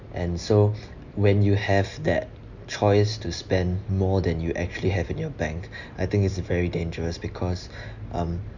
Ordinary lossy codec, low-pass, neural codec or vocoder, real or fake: none; 7.2 kHz; none; real